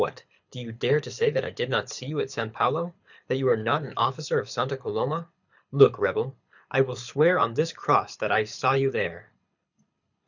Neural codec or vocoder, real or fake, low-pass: codec, 24 kHz, 6 kbps, HILCodec; fake; 7.2 kHz